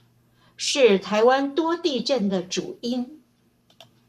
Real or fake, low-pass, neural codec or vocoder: fake; 14.4 kHz; codec, 44.1 kHz, 7.8 kbps, Pupu-Codec